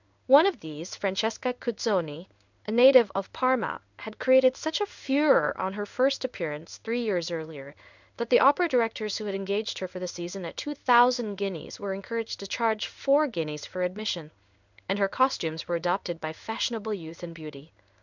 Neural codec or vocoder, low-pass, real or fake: codec, 16 kHz in and 24 kHz out, 1 kbps, XY-Tokenizer; 7.2 kHz; fake